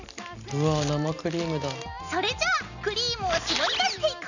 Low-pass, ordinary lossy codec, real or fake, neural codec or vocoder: 7.2 kHz; none; real; none